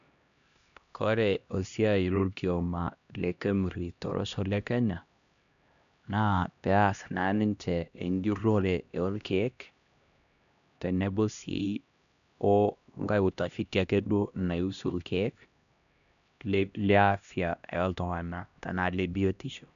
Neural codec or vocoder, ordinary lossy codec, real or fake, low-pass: codec, 16 kHz, 1 kbps, X-Codec, HuBERT features, trained on LibriSpeech; none; fake; 7.2 kHz